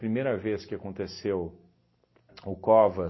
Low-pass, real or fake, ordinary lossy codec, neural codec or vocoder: 7.2 kHz; real; MP3, 24 kbps; none